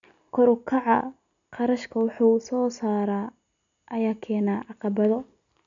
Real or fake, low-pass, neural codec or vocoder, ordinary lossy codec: real; 7.2 kHz; none; none